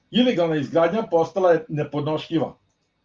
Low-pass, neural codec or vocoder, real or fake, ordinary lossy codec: 7.2 kHz; none; real; Opus, 32 kbps